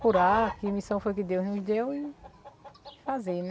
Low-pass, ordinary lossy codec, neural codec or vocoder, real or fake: none; none; none; real